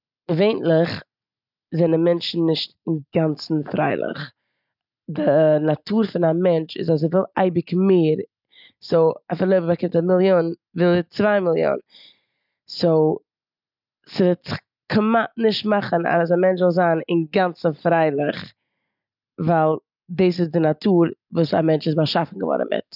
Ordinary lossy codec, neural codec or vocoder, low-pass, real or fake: none; none; 5.4 kHz; real